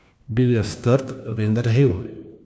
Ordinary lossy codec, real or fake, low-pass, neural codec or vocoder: none; fake; none; codec, 16 kHz, 1 kbps, FunCodec, trained on LibriTTS, 50 frames a second